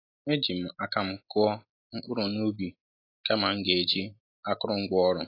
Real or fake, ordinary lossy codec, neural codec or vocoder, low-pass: real; AAC, 32 kbps; none; 5.4 kHz